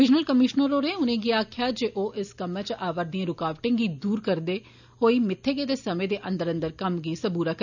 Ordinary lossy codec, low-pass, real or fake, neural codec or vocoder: none; 7.2 kHz; real; none